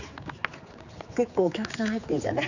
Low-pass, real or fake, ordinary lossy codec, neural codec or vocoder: 7.2 kHz; fake; none; codec, 16 kHz, 4 kbps, X-Codec, HuBERT features, trained on general audio